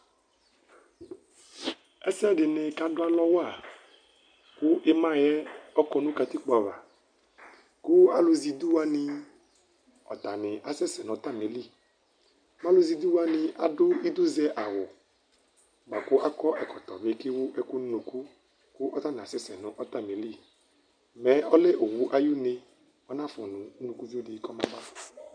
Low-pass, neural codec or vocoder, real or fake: 9.9 kHz; none; real